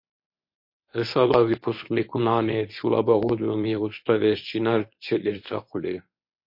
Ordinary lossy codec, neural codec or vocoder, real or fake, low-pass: MP3, 32 kbps; codec, 24 kHz, 0.9 kbps, WavTokenizer, medium speech release version 1; fake; 5.4 kHz